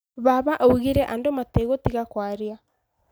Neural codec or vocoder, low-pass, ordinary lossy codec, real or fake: none; none; none; real